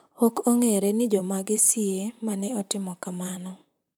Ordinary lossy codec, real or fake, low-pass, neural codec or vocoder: none; fake; none; vocoder, 44.1 kHz, 128 mel bands, Pupu-Vocoder